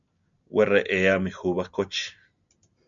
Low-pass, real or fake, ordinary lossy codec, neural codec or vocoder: 7.2 kHz; real; MP3, 96 kbps; none